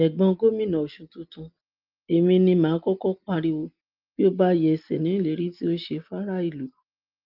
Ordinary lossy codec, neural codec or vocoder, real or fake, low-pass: Opus, 32 kbps; none; real; 5.4 kHz